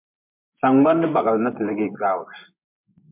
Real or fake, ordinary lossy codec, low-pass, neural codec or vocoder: fake; MP3, 32 kbps; 3.6 kHz; vocoder, 24 kHz, 100 mel bands, Vocos